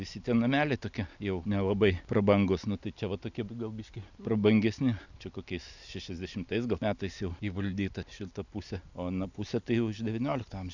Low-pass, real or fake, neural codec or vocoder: 7.2 kHz; real; none